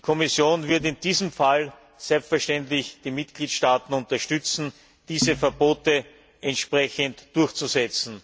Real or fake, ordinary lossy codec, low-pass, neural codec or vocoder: real; none; none; none